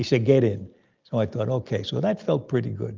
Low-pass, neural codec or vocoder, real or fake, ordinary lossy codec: 7.2 kHz; none; real; Opus, 32 kbps